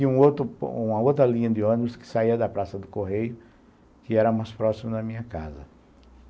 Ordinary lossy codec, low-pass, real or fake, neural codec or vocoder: none; none; real; none